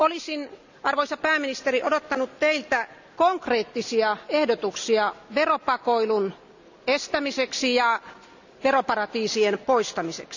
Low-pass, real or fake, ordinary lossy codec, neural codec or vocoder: 7.2 kHz; real; none; none